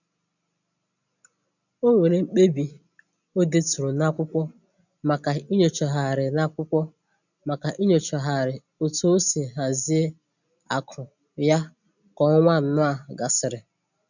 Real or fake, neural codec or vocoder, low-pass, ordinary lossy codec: real; none; 7.2 kHz; none